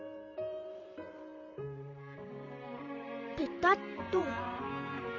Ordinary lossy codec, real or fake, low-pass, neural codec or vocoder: none; fake; 7.2 kHz; vocoder, 44.1 kHz, 128 mel bands, Pupu-Vocoder